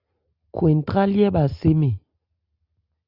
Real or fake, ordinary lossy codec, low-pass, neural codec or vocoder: real; Opus, 64 kbps; 5.4 kHz; none